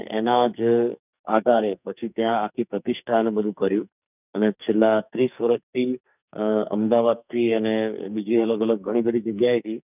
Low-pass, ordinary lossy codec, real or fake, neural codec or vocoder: 3.6 kHz; none; fake; codec, 44.1 kHz, 2.6 kbps, SNAC